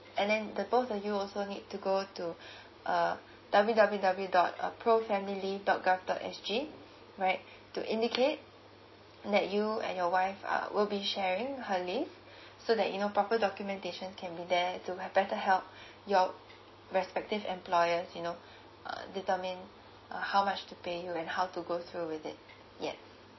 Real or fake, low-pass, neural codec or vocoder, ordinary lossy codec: real; 7.2 kHz; none; MP3, 24 kbps